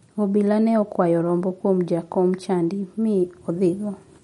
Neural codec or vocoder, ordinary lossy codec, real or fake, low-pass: none; MP3, 48 kbps; real; 19.8 kHz